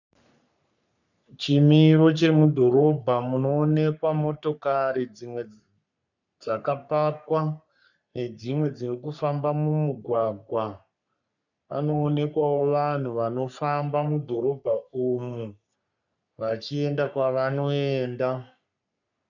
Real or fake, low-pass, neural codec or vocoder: fake; 7.2 kHz; codec, 44.1 kHz, 3.4 kbps, Pupu-Codec